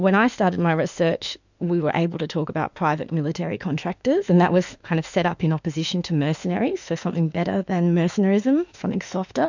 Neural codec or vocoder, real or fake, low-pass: autoencoder, 48 kHz, 32 numbers a frame, DAC-VAE, trained on Japanese speech; fake; 7.2 kHz